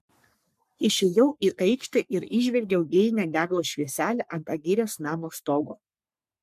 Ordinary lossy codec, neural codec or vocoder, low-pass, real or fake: MP3, 96 kbps; codec, 44.1 kHz, 3.4 kbps, Pupu-Codec; 14.4 kHz; fake